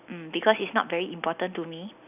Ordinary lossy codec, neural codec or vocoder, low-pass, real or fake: none; none; 3.6 kHz; real